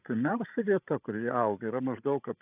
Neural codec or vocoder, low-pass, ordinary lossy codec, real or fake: codec, 16 kHz, 16 kbps, FreqCodec, larger model; 3.6 kHz; AAC, 32 kbps; fake